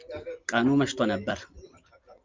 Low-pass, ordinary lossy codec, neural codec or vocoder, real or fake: 7.2 kHz; Opus, 24 kbps; none; real